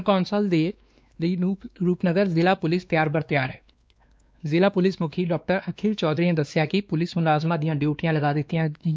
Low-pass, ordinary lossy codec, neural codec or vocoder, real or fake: none; none; codec, 16 kHz, 2 kbps, X-Codec, WavLM features, trained on Multilingual LibriSpeech; fake